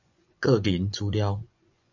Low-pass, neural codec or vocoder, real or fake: 7.2 kHz; vocoder, 24 kHz, 100 mel bands, Vocos; fake